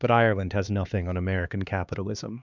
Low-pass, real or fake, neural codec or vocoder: 7.2 kHz; fake; codec, 16 kHz, 2 kbps, X-Codec, HuBERT features, trained on LibriSpeech